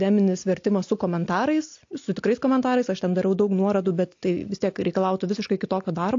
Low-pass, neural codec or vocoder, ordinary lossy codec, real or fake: 7.2 kHz; none; AAC, 48 kbps; real